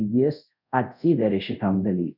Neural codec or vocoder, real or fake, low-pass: codec, 24 kHz, 0.5 kbps, DualCodec; fake; 5.4 kHz